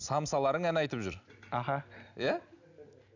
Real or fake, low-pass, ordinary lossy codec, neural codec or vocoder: real; 7.2 kHz; none; none